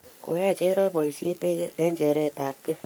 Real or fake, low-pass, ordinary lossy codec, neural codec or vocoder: fake; none; none; codec, 44.1 kHz, 3.4 kbps, Pupu-Codec